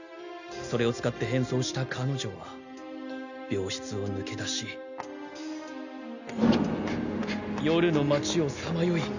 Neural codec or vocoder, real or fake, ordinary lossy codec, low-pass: none; real; none; 7.2 kHz